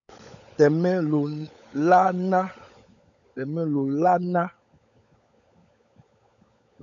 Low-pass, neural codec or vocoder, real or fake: 7.2 kHz; codec, 16 kHz, 16 kbps, FunCodec, trained on LibriTTS, 50 frames a second; fake